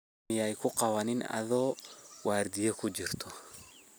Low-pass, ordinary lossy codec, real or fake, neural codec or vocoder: none; none; real; none